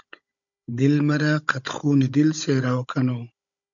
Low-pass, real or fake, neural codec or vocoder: 7.2 kHz; fake; codec, 16 kHz, 16 kbps, FunCodec, trained on Chinese and English, 50 frames a second